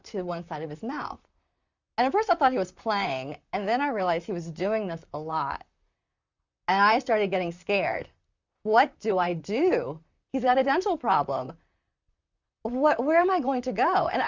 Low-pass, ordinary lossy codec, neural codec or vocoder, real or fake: 7.2 kHz; Opus, 64 kbps; vocoder, 44.1 kHz, 128 mel bands, Pupu-Vocoder; fake